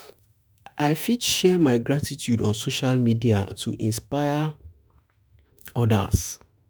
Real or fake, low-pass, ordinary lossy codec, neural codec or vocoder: fake; none; none; autoencoder, 48 kHz, 32 numbers a frame, DAC-VAE, trained on Japanese speech